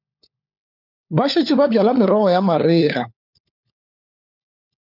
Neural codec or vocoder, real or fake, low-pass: codec, 16 kHz, 4 kbps, FunCodec, trained on LibriTTS, 50 frames a second; fake; 5.4 kHz